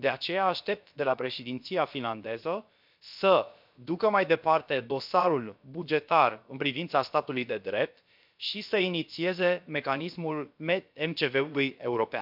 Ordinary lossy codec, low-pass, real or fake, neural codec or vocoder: MP3, 48 kbps; 5.4 kHz; fake; codec, 16 kHz, about 1 kbps, DyCAST, with the encoder's durations